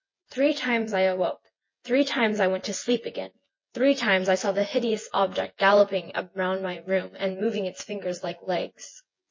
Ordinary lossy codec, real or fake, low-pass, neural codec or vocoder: MP3, 32 kbps; fake; 7.2 kHz; vocoder, 24 kHz, 100 mel bands, Vocos